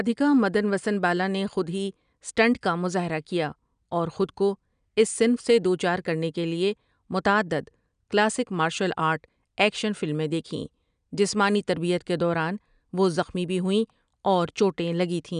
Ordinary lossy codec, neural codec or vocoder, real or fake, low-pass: none; none; real; 9.9 kHz